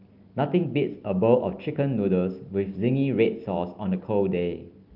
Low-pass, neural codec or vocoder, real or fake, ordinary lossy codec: 5.4 kHz; none; real; Opus, 24 kbps